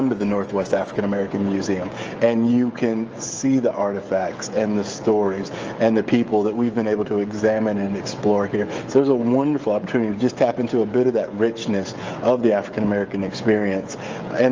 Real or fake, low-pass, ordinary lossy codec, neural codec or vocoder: fake; 7.2 kHz; Opus, 24 kbps; codec, 16 kHz, 16 kbps, FreqCodec, smaller model